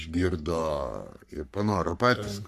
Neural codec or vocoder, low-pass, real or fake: codec, 44.1 kHz, 3.4 kbps, Pupu-Codec; 14.4 kHz; fake